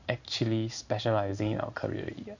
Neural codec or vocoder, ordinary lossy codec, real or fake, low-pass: codec, 16 kHz in and 24 kHz out, 1 kbps, XY-Tokenizer; none; fake; 7.2 kHz